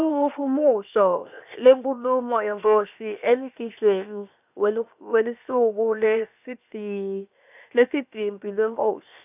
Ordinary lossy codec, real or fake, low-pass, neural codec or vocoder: none; fake; 3.6 kHz; codec, 16 kHz, 0.7 kbps, FocalCodec